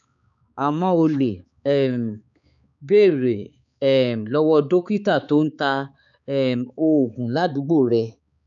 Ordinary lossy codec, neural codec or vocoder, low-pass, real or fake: none; codec, 16 kHz, 4 kbps, X-Codec, HuBERT features, trained on balanced general audio; 7.2 kHz; fake